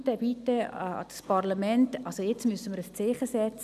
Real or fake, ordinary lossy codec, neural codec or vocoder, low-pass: real; none; none; 14.4 kHz